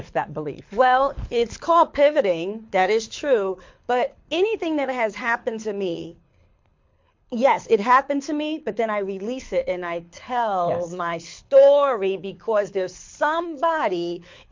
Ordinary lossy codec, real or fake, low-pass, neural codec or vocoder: MP3, 48 kbps; fake; 7.2 kHz; codec, 24 kHz, 6 kbps, HILCodec